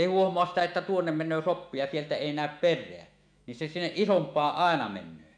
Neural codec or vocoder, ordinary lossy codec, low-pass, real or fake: autoencoder, 48 kHz, 128 numbers a frame, DAC-VAE, trained on Japanese speech; none; 9.9 kHz; fake